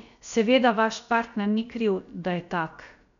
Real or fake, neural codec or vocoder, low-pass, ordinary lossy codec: fake; codec, 16 kHz, about 1 kbps, DyCAST, with the encoder's durations; 7.2 kHz; none